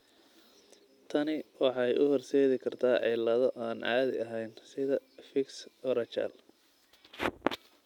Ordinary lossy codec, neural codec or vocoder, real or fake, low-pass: none; none; real; 19.8 kHz